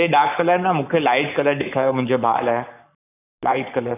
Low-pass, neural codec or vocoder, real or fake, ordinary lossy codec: 3.6 kHz; codec, 44.1 kHz, 7.8 kbps, Pupu-Codec; fake; none